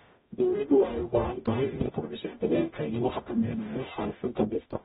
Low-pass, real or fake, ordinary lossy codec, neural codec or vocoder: 19.8 kHz; fake; AAC, 16 kbps; codec, 44.1 kHz, 0.9 kbps, DAC